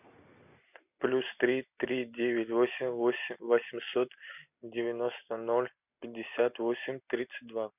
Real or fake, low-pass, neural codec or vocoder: real; 3.6 kHz; none